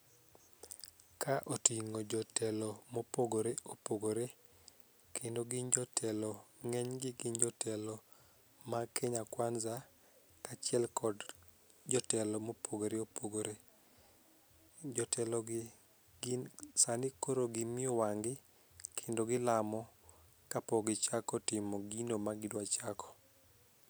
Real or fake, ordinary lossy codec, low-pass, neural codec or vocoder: real; none; none; none